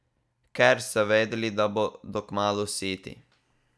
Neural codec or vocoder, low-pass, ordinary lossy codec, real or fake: none; none; none; real